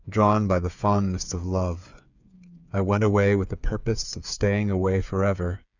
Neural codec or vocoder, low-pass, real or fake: codec, 16 kHz, 8 kbps, FreqCodec, smaller model; 7.2 kHz; fake